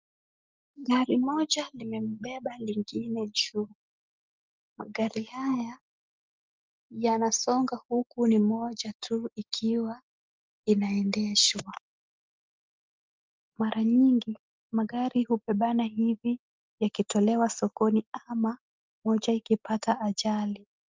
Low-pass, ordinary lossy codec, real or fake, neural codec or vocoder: 7.2 kHz; Opus, 24 kbps; real; none